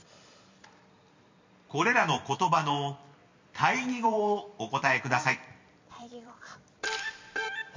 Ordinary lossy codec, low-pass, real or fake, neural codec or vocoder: MP3, 64 kbps; 7.2 kHz; fake; vocoder, 44.1 kHz, 128 mel bands every 256 samples, BigVGAN v2